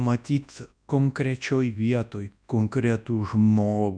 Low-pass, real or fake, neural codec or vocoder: 9.9 kHz; fake; codec, 24 kHz, 0.9 kbps, WavTokenizer, large speech release